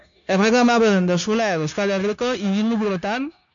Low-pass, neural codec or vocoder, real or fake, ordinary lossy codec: 7.2 kHz; codec, 16 kHz, 0.9 kbps, LongCat-Audio-Codec; fake; AAC, 64 kbps